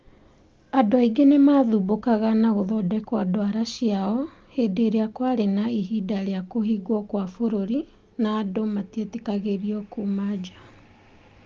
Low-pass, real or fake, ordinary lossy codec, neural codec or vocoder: 7.2 kHz; real; Opus, 32 kbps; none